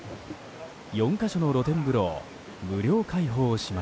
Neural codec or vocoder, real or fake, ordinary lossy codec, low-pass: none; real; none; none